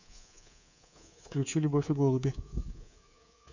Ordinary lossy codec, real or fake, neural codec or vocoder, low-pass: none; fake; codec, 24 kHz, 3.1 kbps, DualCodec; 7.2 kHz